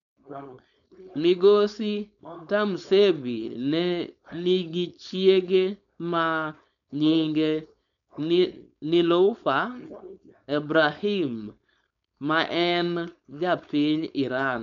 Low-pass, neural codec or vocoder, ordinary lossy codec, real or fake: 7.2 kHz; codec, 16 kHz, 4.8 kbps, FACodec; MP3, 96 kbps; fake